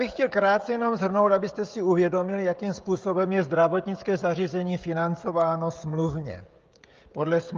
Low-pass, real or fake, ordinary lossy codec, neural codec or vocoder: 7.2 kHz; fake; Opus, 32 kbps; codec, 16 kHz, 16 kbps, FreqCodec, smaller model